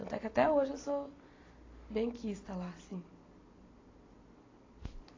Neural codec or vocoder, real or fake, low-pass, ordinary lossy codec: none; real; 7.2 kHz; AAC, 32 kbps